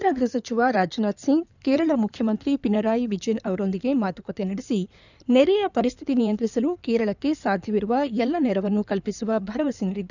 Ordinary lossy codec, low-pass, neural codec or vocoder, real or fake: none; 7.2 kHz; codec, 16 kHz in and 24 kHz out, 2.2 kbps, FireRedTTS-2 codec; fake